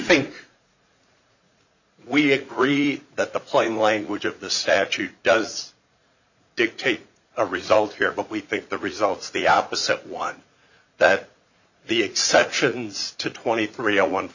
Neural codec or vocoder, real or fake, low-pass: vocoder, 44.1 kHz, 80 mel bands, Vocos; fake; 7.2 kHz